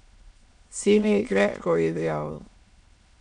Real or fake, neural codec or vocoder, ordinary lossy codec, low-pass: fake; autoencoder, 22.05 kHz, a latent of 192 numbers a frame, VITS, trained on many speakers; none; 9.9 kHz